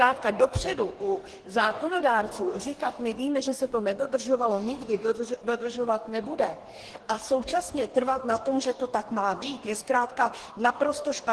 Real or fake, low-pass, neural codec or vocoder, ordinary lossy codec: fake; 10.8 kHz; codec, 44.1 kHz, 2.6 kbps, DAC; Opus, 16 kbps